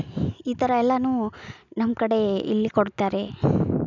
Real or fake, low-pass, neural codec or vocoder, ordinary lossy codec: real; 7.2 kHz; none; none